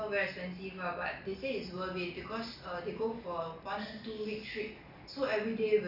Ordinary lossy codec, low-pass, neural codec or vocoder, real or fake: none; 5.4 kHz; none; real